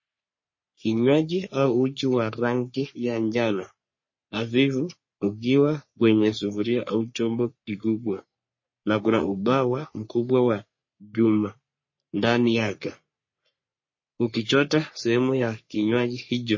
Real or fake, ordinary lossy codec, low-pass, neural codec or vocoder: fake; MP3, 32 kbps; 7.2 kHz; codec, 44.1 kHz, 3.4 kbps, Pupu-Codec